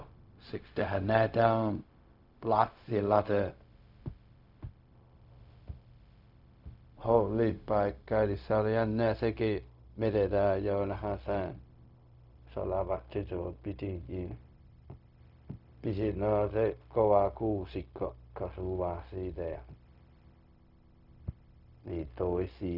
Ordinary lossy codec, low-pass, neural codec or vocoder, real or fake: none; 5.4 kHz; codec, 16 kHz, 0.4 kbps, LongCat-Audio-Codec; fake